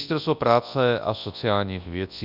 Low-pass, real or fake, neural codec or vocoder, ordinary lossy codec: 5.4 kHz; fake; codec, 24 kHz, 0.9 kbps, WavTokenizer, large speech release; Opus, 64 kbps